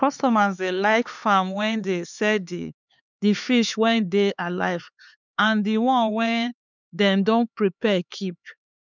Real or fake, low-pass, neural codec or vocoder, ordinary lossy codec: fake; 7.2 kHz; codec, 16 kHz, 4 kbps, X-Codec, HuBERT features, trained on LibriSpeech; none